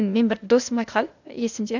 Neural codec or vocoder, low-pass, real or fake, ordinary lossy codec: codec, 16 kHz, 0.8 kbps, ZipCodec; 7.2 kHz; fake; none